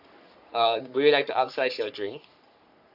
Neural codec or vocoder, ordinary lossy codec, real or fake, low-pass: codec, 44.1 kHz, 3.4 kbps, Pupu-Codec; none; fake; 5.4 kHz